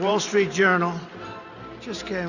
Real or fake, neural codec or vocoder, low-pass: fake; vocoder, 44.1 kHz, 128 mel bands every 512 samples, BigVGAN v2; 7.2 kHz